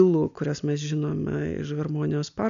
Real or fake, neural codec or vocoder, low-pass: real; none; 7.2 kHz